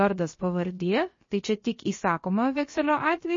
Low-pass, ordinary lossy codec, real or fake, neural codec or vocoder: 7.2 kHz; MP3, 32 kbps; fake; codec, 16 kHz, about 1 kbps, DyCAST, with the encoder's durations